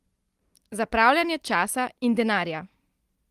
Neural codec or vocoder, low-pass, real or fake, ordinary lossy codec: none; 14.4 kHz; real; Opus, 24 kbps